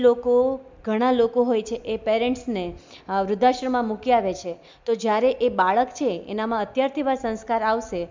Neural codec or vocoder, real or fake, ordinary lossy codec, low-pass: none; real; MP3, 64 kbps; 7.2 kHz